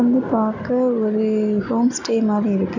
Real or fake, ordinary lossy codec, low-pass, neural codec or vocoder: real; none; 7.2 kHz; none